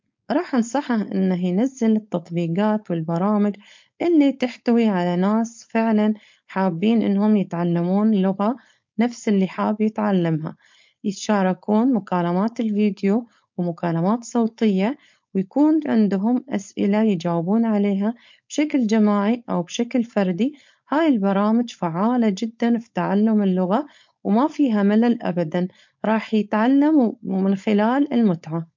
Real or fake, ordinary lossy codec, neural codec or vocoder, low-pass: fake; MP3, 48 kbps; codec, 16 kHz, 4.8 kbps, FACodec; 7.2 kHz